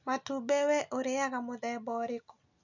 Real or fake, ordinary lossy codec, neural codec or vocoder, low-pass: real; none; none; 7.2 kHz